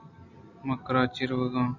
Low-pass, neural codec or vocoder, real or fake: 7.2 kHz; none; real